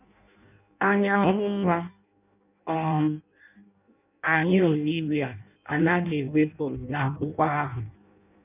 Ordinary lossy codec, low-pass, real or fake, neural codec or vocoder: none; 3.6 kHz; fake; codec, 16 kHz in and 24 kHz out, 0.6 kbps, FireRedTTS-2 codec